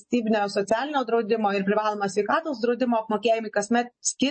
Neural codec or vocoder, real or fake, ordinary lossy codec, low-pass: none; real; MP3, 32 kbps; 9.9 kHz